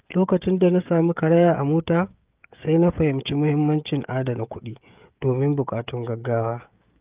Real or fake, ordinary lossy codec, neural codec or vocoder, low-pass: fake; Opus, 24 kbps; codec, 16 kHz, 16 kbps, FreqCodec, smaller model; 3.6 kHz